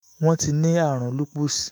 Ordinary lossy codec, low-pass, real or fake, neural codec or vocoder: none; none; fake; autoencoder, 48 kHz, 128 numbers a frame, DAC-VAE, trained on Japanese speech